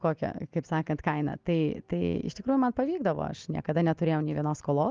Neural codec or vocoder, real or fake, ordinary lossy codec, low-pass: none; real; Opus, 32 kbps; 7.2 kHz